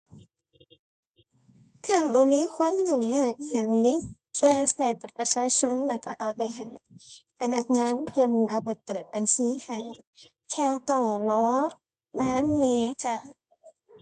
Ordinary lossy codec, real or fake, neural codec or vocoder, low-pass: none; fake; codec, 24 kHz, 0.9 kbps, WavTokenizer, medium music audio release; 10.8 kHz